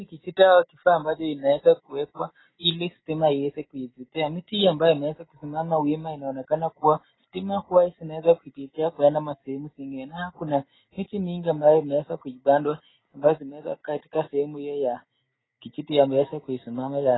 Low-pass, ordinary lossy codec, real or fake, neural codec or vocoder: 7.2 kHz; AAC, 16 kbps; real; none